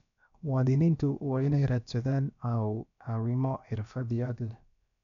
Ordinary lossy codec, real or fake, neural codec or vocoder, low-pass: none; fake; codec, 16 kHz, about 1 kbps, DyCAST, with the encoder's durations; 7.2 kHz